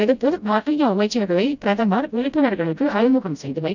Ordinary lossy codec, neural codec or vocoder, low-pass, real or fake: none; codec, 16 kHz, 0.5 kbps, FreqCodec, smaller model; 7.2 kHz; fake